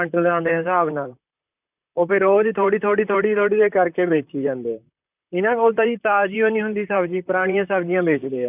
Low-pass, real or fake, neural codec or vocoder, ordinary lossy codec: 3.6 kHz; fake; vocoder, 44.1 kHz, 128 mel bands, Pupu-Vocoder; none